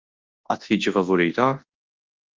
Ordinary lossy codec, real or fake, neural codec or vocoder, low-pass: Opus, 32 kbps; fake; codec, 24 kHz, 0.9 kbps, WavTokenizer, large speech release; 7.2 kHz